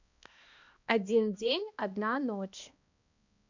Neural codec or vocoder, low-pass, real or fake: codec, 16 kHz, 1 kbps, X-Codec, HuBERT features, trained on balanced general audio; 7.2 kHz; fake